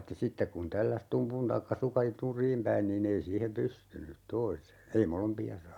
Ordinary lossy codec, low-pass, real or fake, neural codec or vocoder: none; 19.8 kHz; real; none